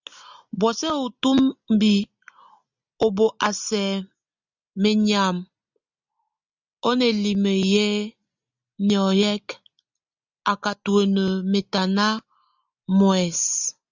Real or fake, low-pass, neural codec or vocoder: real; 7.2 kHz; none